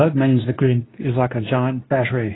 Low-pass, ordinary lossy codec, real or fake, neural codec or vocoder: 7.2 kHz; AAC, 16 kbps; fake; codec, 24 kHz, 0.9 kbps, WavTokenizer, medium speech release version 2